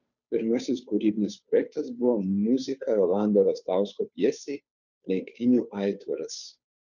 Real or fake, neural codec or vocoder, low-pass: fake; codec, 16 kHz, 2 kbps, FunCodec, trained on Chinese and English, 25 frames a second; 7.2 kHz